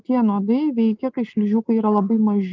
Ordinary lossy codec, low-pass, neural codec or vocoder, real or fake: Opus, 24 kbps; 7.2 kHz; none; real